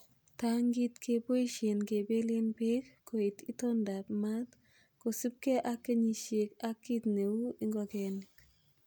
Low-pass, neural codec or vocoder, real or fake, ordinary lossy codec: none; none; real; none